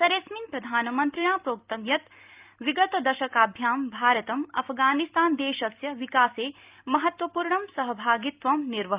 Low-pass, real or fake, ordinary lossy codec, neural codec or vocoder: 3.6 kHz; real; Opus, 24 kbps; none